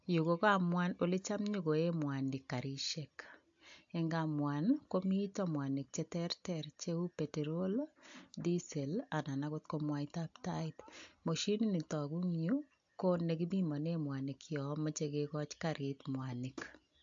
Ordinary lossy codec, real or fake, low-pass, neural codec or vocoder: AAC, 64 kbps; real; 7.2 kHz; none